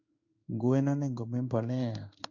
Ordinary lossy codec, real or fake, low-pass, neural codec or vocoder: AAC, 48 kbps; fake; 7.2 kHz; codec, 16 kHz in and 24 kHz out, 1 kbps, XY-Tokenizer